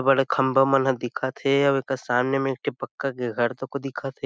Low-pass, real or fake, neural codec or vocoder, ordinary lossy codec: none; real; none; none